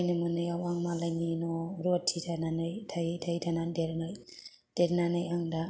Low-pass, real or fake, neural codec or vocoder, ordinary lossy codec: none; real; none; none